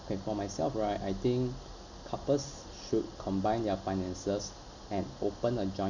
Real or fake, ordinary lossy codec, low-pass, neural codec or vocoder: real; none; 7.2 kHz; none